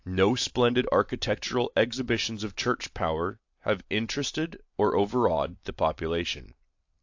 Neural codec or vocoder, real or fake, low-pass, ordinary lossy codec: none; real; 7.2 kHz; AAC, 48 kbps